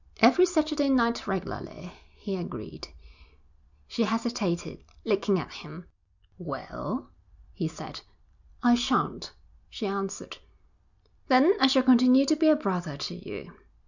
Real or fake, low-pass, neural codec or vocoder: real; 7.2 kHz; none